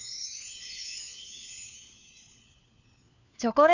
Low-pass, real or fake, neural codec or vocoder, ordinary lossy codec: 7.2 kHz; fake; codec, 16 kHz, 4 kbps, FunCodec, trained on LibriTTS, 50 frames a second; none